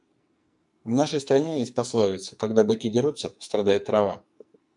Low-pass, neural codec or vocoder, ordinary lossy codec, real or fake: 10.8 kHz; codec, 44.1 kHz, 2.6 kbps, SNAC; MP3, 96 kbps; fake